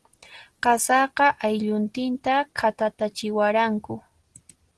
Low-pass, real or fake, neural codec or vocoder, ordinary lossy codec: 10.8 kHz; real; none; Opus, 16 kbps